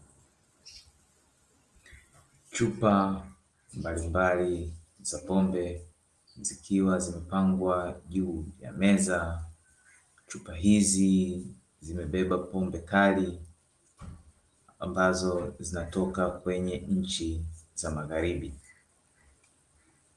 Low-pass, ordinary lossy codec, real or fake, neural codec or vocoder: 10.8 kHz; Opus, 24 kbps; real; none